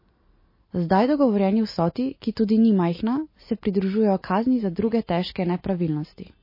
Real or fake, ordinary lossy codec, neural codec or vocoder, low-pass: real; MP3, 24 kbps; none; 5.4 kHz